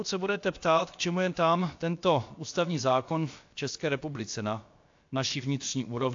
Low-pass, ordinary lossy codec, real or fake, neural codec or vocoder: 7.2 kHz; AAC, 48 kbps; fake; codec, 16 kHz, about 1 kbps, DyCAST, with the encoder's durations